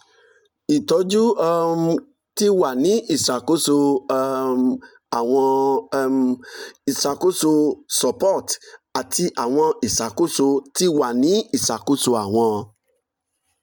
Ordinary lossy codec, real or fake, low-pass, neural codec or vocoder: none; real; none; none